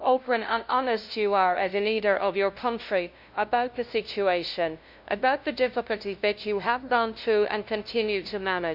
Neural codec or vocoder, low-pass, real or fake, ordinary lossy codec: codec, 16 kHz, 0.5 kbps, FunCodec, trained on LibriTTS, 25 frames a second; 5.4 kHz; fake; MP3, 48 kbps